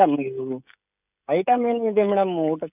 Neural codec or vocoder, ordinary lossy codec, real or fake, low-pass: codec, 16 kHz, 16 kbps, FreqCodec, smaller model; none; fake; 3.6 kHz